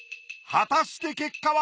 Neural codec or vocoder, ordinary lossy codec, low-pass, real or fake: none; none; none; real